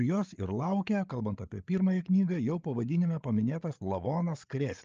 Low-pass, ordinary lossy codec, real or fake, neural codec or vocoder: 7.2 kHz; Opus, 24 kbps; fake; codec, 16 kHz, 8 kbps, FreqCodec, larger model